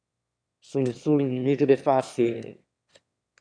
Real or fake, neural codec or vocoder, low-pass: fake; autoencoder, 22.05 kHz, a latent of 192 numbers a frame, VITS, trained on one speaker; 9.9 kHz